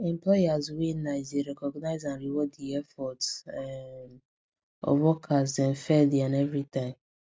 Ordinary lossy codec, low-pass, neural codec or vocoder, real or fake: none; none; none; real